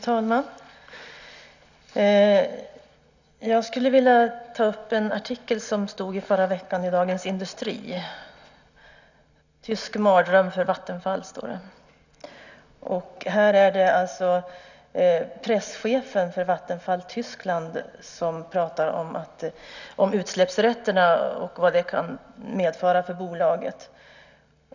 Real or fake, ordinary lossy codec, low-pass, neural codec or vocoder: real; none; 7.2 kHz; none